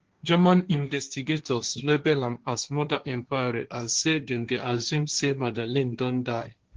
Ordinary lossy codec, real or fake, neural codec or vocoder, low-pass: Opus, 16 kbps; fake; codec, 16 kHz, 1.1 kbps, Voila-Tokenizer; 7.2 kHz